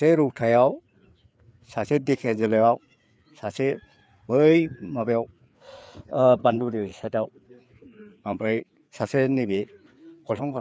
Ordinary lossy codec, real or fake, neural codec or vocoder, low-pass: none; fake; codec, 16 kHz, 4 kbps, FreqCodec, larger model; none